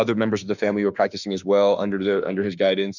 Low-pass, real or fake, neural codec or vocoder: 7.2 kHz; fake; autoencoder, 48 kHz, 32 numbers a frame, DAC-VAE, trained on Japanese speech